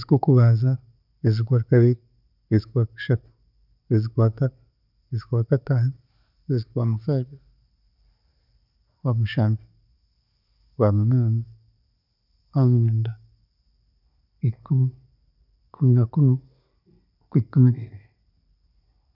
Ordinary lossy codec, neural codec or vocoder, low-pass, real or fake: none; none; 5.4 kHz; real